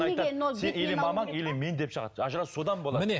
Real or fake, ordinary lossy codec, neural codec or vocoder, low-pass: real; none; none; none